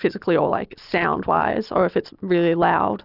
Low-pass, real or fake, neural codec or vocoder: 5.4 kHz; real; none